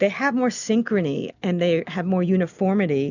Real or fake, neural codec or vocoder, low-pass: real; none; 7.2 kHz